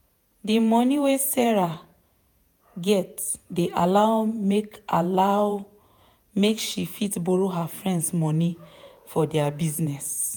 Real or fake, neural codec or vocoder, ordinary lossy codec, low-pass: fake; vocoder, 48 kHz, 128 mel bands, Vocos; none; none